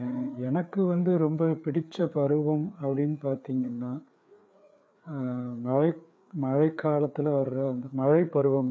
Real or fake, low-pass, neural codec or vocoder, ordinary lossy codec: fake; none; codec, 16 kHz, 4 kbps, FreqCodec, larger model; none